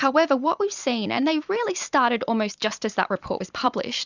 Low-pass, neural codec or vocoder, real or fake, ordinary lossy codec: 7.2 kHz; vocoder, 44.1 kHz, 128 mel bands every 256 samples, BigVGAN v2; fake; Opus, 64 kbps